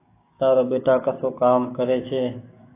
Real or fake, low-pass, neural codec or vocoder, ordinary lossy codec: fake; 3.6 kHz; codec, 16 kHz, 6 kbps, DAC; AAC, 24 kbps